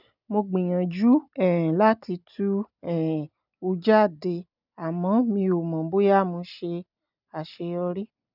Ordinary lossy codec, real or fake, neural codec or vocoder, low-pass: none; real; none; 5.4 kHz